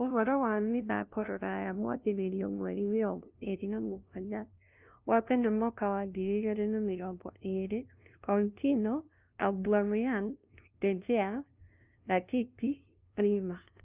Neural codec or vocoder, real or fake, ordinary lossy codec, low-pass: codec, 16 kHz, 0.5 kbps, FunCodec, trained on LibriTTS, 25 frames a second; fake; Opus, 24 kbps; 3.6 kHz